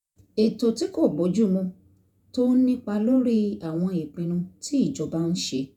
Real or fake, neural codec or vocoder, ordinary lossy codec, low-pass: fake; vocoder, 48 kHz, 128 mel bands, Vocos; none; 19.8 kHz